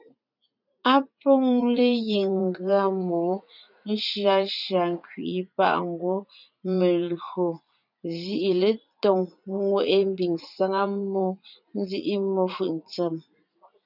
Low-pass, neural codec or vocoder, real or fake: 5.4 kHz; vocoder, 44.1 kHz, 80 mel bands, Vocos; fake